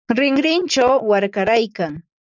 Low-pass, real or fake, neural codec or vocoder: 7.2 kHz; fake; vocoder, 44.1 kHz, 80 mel bands, Vocos